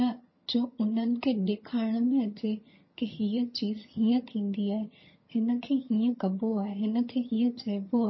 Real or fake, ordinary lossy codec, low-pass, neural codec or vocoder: fake; MP3, 24 kbps; 7.2 kHz; vocoder, 22.05 kHz, 80 mel bands, HiFi-GAN